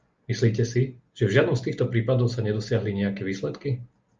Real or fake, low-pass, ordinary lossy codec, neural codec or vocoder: real; 7.2 kHz; Opus, 24 kbps; none